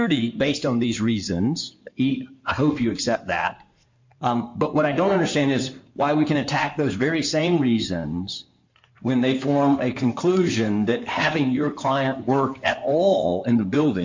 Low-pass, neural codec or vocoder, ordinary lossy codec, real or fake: 7.2 kHz; codec, 16 kHz in and 24 kHz out, 2.2 kbps, FireRedTTS-2 codec; MP3, 48 kbps; fake